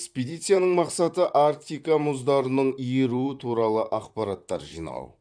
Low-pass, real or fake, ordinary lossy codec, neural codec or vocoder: 9.9 kHz; fake; none; vocoder, 44.1 kHz, 128 mel bands, Pupu-Vocoder